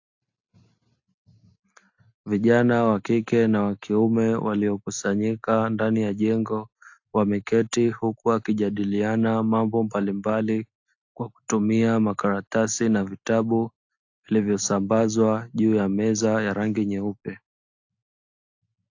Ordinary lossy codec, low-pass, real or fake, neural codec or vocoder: AAC, 48 kbps; 7.2 kHz; real; none